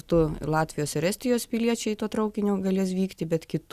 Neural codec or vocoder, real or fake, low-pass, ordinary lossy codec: none; real; 14.4 kHz; Opus, 64 kbps